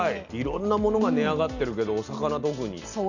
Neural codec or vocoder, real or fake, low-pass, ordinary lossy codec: none; real; 7.2 kHz; none